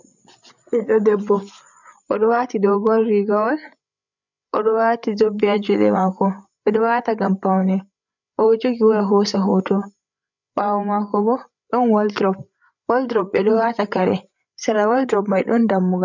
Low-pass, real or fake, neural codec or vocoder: 7.2 kHz; fake; codec, 16 kHz, 8 kbps, FreqCodec, larger model